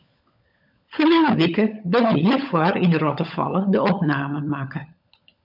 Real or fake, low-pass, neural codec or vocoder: fake; 5.4 kHz; codec, 16 kHz, 16 kbps, FunCodec, trained on LibriTTS, 50 frames a second